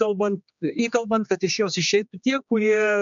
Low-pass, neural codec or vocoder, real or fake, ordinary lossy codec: 7.2 kHz; codec, 16 kHz, 2 kbps, X-Codec, HuBERT features, trained on general audio; fake; MP3, 64 kbps